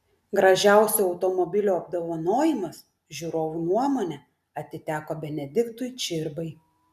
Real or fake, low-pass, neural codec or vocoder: real; 14.4 kHz; none